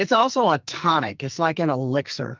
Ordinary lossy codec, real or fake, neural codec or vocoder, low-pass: Opus, 24 kbps; fake; codec, 44.1 kHz, 2.6 kbps, SNAC; 7.2 kHz